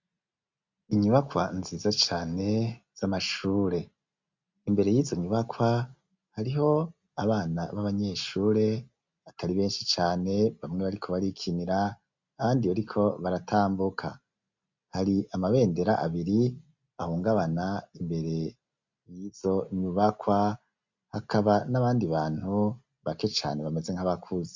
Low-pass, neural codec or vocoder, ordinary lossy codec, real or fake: 7.2 kHz; none; MP3, 64 kbps; real